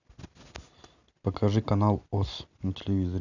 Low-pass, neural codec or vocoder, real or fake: 7.2 kHz; none; real